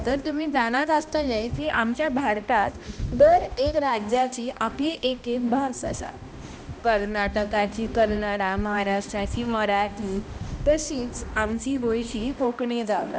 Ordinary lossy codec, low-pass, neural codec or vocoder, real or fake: none; none; codec, 16 kHz, 1 kbps, X-Codec, HuBERT features, trained on balanced general audio; fake